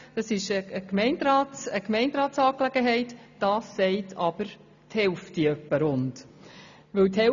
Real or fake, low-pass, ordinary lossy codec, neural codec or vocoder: real; 7.2 kHz; none; none